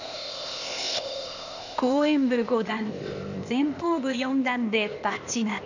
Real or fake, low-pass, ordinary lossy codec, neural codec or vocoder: fake; 7.2 kHz; none; codec, 16 kHz, 0.8 kbps, ZipCodec